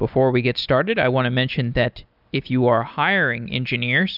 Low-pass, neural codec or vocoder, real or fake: 5.4 kHz; none; real